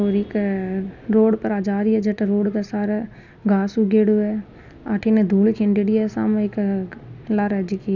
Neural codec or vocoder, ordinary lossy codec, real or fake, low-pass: none; none; real; 7.2 kHz